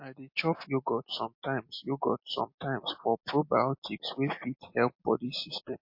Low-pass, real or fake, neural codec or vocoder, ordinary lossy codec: 5.4 kHz; real; none; MP3, 32 kbps